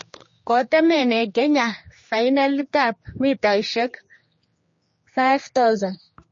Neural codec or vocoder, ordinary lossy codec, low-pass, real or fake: codec, 16 kHz, 2 kbps, X-Codec, HuBERT features, trained on general audio; MP3, 32 kbps; 7.2 kHz; fake